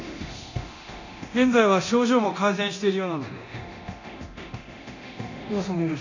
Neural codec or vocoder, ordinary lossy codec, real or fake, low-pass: codec, 24 kHz, 0.9 kbps, DualCodec; none; fake; 7.2 kHz